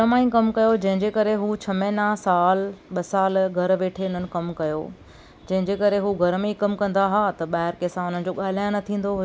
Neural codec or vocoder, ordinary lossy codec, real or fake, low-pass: none; none; real; none